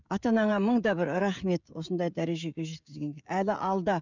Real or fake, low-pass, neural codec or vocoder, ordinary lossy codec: fake; 7.2 kHz; codec, 16 kHz, 16 kbps, FreqCodec, smaller model; none